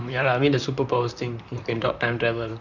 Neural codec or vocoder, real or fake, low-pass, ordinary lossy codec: vocoder, 44.1 kHz, 128 mel bands, Pupu-Vocoder; fake; 7.2 kHz; none